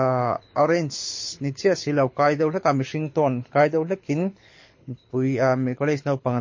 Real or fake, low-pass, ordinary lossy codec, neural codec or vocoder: fake; 7.2 kHz; MP3, 32 kbps; codec, 24 kHz, 6 kbps, HILCodec